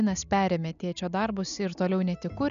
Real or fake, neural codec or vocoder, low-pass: real; none; 7.2 kHz